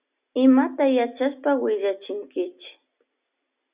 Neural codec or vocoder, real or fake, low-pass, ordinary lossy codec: none; real; 3.6 kHz; Opus, 64 kbps